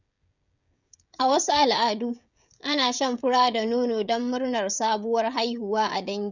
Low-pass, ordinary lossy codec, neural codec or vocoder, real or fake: 7.2 kHz; none; codec, 16 kHz, 16 kbps, FreqCodec, smaller model; fake